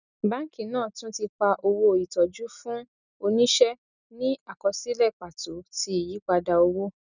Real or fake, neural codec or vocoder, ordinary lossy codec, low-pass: real; none; none; 7.2 kHz